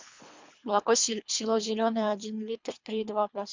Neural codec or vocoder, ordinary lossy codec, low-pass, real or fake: codec, 24 kHz, 3 kbps, HILCodec; MP3, 64 kbps; 7.2 kHz; fake